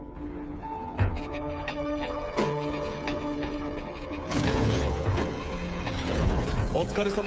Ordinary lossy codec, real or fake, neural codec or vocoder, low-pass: none; fake; codec, 16 kHz, 8 kbps, FreqCodec, smaller model; none